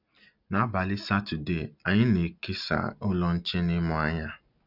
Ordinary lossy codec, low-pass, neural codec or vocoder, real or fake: none; 5.4 kHz; none; real